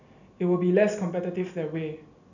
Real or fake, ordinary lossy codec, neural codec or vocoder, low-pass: real; none; none; 7.2 kHz